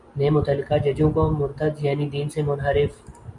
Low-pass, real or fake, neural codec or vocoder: 10.8 kHz; real; none